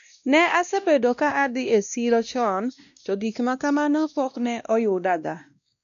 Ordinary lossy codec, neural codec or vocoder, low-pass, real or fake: none; codec, 16 kHz, 1 kbps, X-Codec, WavLM features, trained on Multilingual LibriSpeech; 7.2 kHz; fake